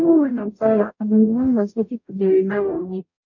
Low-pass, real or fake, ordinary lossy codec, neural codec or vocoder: 7.2 kHz; fake; none; codec, 44.1 kHz, 0.9 kbps, DAC